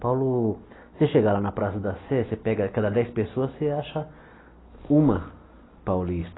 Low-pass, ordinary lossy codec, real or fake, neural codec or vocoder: 7.2 kHz; AAC, 16 kbps; real; none